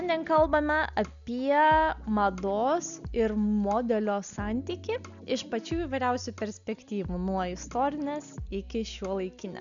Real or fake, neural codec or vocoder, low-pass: real; none; 7.2 kHz